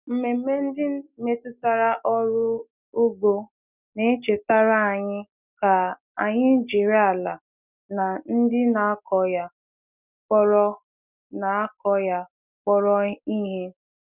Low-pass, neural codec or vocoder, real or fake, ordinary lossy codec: 3.6 kHz; none; real; none